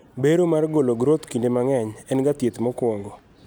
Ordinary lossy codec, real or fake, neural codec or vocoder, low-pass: none; real; none; none